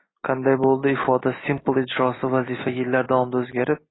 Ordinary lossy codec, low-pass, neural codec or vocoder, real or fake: AAC, 16 kbps; 7.2 kHz; none; real